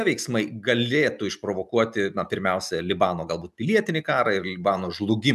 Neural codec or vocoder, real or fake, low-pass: none; real; 14.4 kHz